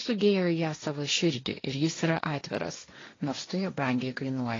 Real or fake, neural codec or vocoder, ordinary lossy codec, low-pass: fake; codec, 16 kHz, 1.1 kbps, Voila-Tokenizer; AAC, 32 kbps; 7.2 kHz